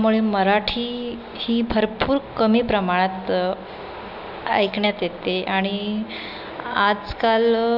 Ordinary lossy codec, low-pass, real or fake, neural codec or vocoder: none; 5.4 kHz; real; none